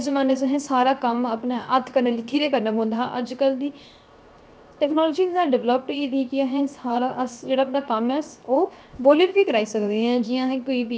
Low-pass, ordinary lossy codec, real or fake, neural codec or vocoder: none; none; fake; codec, 16 kHz, 0.7 kbps, FocalCodec